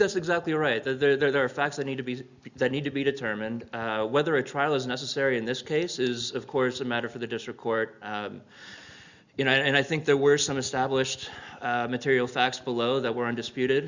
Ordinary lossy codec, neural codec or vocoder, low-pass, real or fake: Opus, 64 kbps; none; 7.2 kHz; real